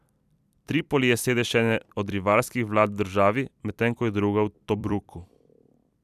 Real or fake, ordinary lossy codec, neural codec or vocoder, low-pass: fake; none; vocoder, 44.1 kHz, 128 mel bands every 256 samples, BigVGAN v2; 14.4 kHz